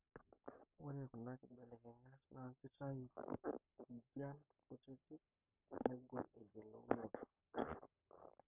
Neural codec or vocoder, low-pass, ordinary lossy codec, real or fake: codec, 44.1 kHz, 7.8 kbps, Pupu-Codec; 3.6 kHz; none; fake